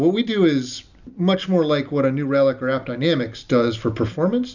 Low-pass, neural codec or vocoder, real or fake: 7.2 kHz; none; real